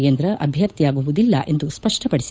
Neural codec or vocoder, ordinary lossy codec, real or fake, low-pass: codec, 16 kHz, 8 kbps, FunCodec, trained on Chinese and English, 25 frames a second; none; fake; none